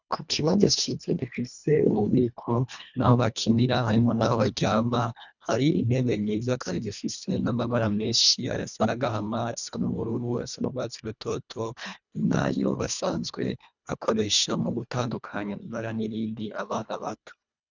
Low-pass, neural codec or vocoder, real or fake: 7.2 kHz; codec, 24 kHz, 1.5 kbps, HILCodec; fake